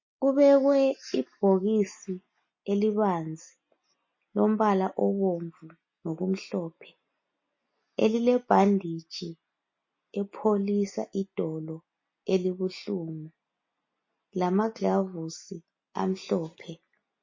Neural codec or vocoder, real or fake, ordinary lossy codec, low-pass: none; real; MP3, 32 kbps; 7.2 kHz